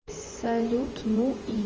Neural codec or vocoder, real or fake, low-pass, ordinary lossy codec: vocoder, 24 kHz, 100 mel bands, Vocos; fake; 7.2 kHz; Opus, 24 kbps